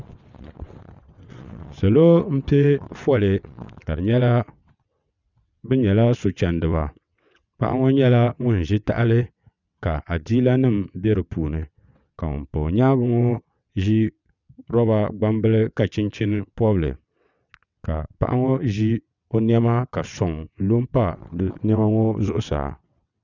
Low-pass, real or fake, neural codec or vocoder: 7.2 kHz; fake; vocoder, 22.05 kHz, 80 mel bands, WaveNeXt